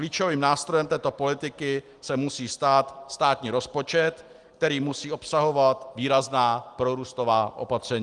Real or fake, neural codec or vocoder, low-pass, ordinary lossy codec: real; none; 10.8 kHz; Opus, 24 kbps